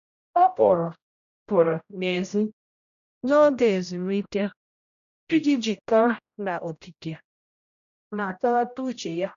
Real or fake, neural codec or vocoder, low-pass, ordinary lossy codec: fake; codec, 16 kHz, 0.5 kbps, X-Codec, HuBERT features, trained on general audio; 7.2 kHz; none